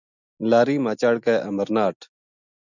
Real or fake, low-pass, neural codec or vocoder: real; 7.2 kHz; none